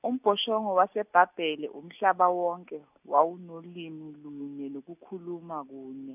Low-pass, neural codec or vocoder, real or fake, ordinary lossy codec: 3.6 kHz; none; real; none